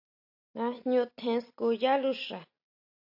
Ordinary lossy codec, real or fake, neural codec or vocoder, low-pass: AAC, 48 kbps; real; none; 5.4 kHz